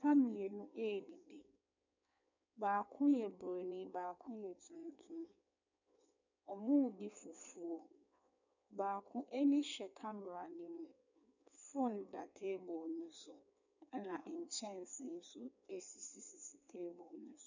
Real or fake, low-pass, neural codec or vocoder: fake; 7.2 kHz; codec, 16 kHz in and 24 kHz out, 1.1 kbps, FireRedTTS-2 codec